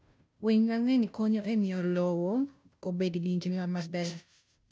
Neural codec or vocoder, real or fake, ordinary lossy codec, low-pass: codec, 16 kHz, 0.5 kbps, FunCodec, trained on Chinese and English, 25 frames a second; fake; none; none